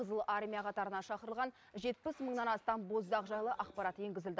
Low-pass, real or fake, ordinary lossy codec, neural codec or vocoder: none; real; none; none